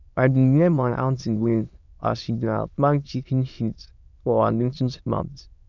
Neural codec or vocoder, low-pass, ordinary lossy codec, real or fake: autoencoder, 22.05 kHz, a latent of 192 numbers a frame, VITS, trained on many speakers; 7.2 kHz; none; fake